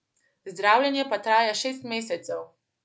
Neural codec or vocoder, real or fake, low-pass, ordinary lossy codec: none; real; none; none